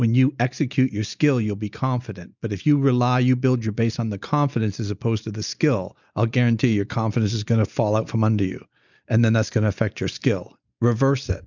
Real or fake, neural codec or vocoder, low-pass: real; none; 7.2 kHz